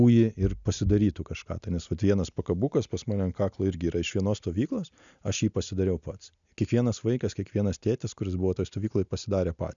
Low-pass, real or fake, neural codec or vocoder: 7.2 kHz; real; none